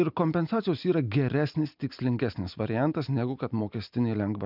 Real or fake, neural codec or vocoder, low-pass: real; none; 5.4 kHz